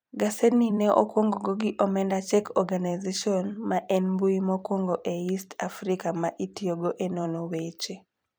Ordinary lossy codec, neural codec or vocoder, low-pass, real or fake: none; vocoder, 44.1 kHz, 128 mel bands every 256 samples, BigVGAN v2; none; fake